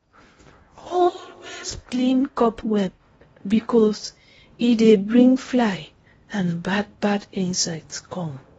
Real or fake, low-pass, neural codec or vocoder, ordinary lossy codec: fake; 10.8 kHz; codec, 16 kHz in and 24 kHz out, 0.6 kbps, FocalCodec, streaming, 4096 codes; AAC, 24 kbps